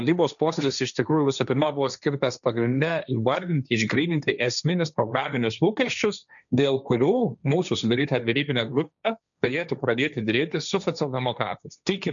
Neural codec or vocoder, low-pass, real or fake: codec, 16 kHz, 1.1 kbps, Voila-Tokenizer; 7.2 kHz; fake